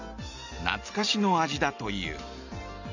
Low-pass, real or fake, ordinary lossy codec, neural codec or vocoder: 7.2 kHz; real; none; none